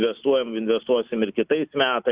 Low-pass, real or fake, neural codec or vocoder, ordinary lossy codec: 3.6 kHz; real; none; Opus, 64 kbps